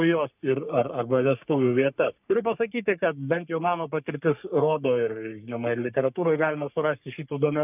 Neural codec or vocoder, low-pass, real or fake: codec, 44.1 kHz, 3.4 kbps, Pupu-Codec; 3.6 kHz; fake